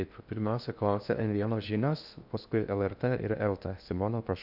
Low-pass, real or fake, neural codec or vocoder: 5.4 kHz; fake; codec, 16 kHz in and 24 kHz out, 0.6 kbps, FocalCodec, streaming, 2048 codes